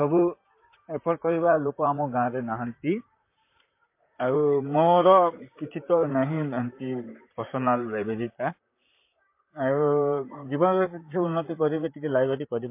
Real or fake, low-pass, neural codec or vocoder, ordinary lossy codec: fake; 3.6 kHz; vocoder, 44.1 kHz, 128 mel bands, Pupu-Vocoder; MP3, 24 kbps